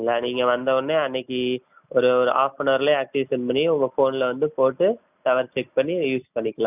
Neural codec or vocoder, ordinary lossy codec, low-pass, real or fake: none; none; 3.6 kHz; real